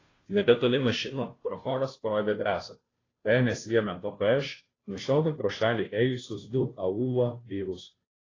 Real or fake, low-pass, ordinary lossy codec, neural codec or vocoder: fake; 7.2 kHz; AAC, 32 kbps; codec, 16 kHz, 0.5 kbps, FunCodec, trained on Chinese and English, 25 frames a second